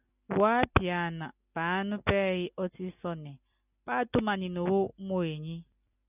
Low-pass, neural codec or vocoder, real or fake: 3.6 kHz; none; real